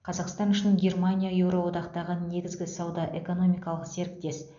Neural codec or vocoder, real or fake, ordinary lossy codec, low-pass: none; real; none; 7.2 kHz